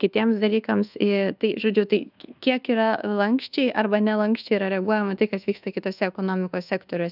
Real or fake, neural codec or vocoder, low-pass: fake; codec, 24 kHz, 1.2 kbps, DualCodec; 5.4 kHz